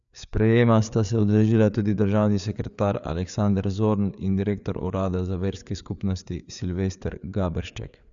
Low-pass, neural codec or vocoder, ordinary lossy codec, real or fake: 7.2 kHz; codec, 16 kHz, 4 kbps, FreqCodec, larger model; none; fake